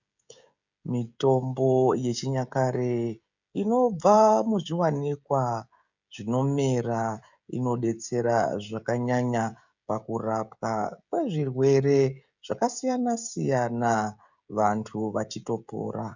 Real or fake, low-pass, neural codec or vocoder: fake; 7.2 kHz; codec, 16 kHz, 16 kbps, FreqCodec, smaller model